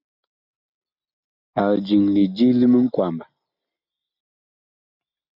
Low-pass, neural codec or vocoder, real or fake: 5.4 kHz; none; real